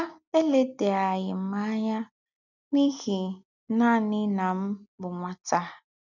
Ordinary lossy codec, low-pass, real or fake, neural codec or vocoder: none; 7.2 kHz; real; none